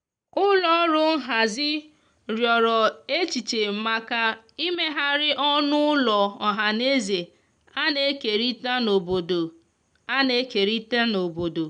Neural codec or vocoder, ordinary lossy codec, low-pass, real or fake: none; none; 9.9 kHz; real